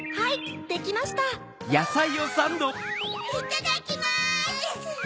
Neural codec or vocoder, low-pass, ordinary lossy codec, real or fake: none; none; none; real